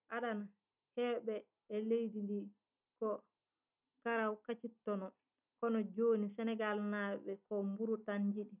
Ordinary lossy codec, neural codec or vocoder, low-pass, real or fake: none; none; 3.6 kHz; real